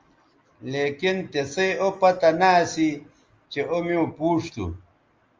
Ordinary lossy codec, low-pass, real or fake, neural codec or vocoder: Opus, 32 kbps; 7.2 kHz; real; none